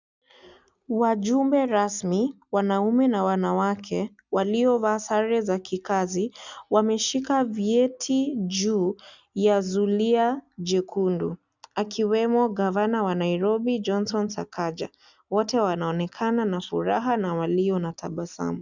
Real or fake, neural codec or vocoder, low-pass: real; none; 7.2 kHz